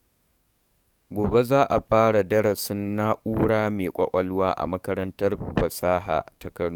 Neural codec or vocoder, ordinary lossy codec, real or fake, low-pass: codec, 44.1 kHz, 7.8 kbps, DAC; none; fake; 19.8 kHz